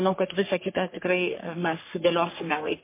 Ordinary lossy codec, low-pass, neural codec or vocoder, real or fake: MP3, 24 kbps; 3.6 kHz; codec, 44.1 kHz, 3.4 kbps, Pupu-Codec; fake